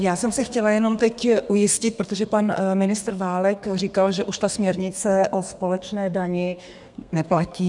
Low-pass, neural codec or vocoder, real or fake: 10.8 kHz; codec, 32 kHz, 1.9 kbps, SNAC; fake